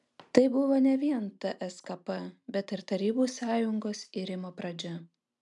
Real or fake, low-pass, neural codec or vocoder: real; 10.8 kHz; none